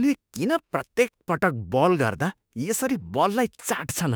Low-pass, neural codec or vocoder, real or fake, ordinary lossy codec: none; autoencoder, 48 kHz, 32 numbers a frame, DAC-VAE, trained on Japanese speech; fake; none